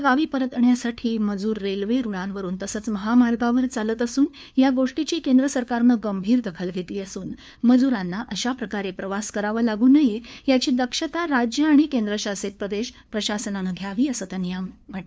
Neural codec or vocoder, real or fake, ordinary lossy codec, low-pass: codec, 16 kHz, 2 kbps, FunCodec, trained on LibriTTS, 25 frames a second; fake; none; none